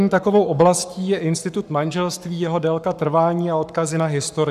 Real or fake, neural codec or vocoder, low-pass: fake; codec, 44.1 kHz, 7.8 kbps, Pupu-Codec; 14.4 kHz